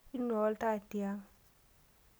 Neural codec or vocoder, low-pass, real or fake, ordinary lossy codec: none; none; real; none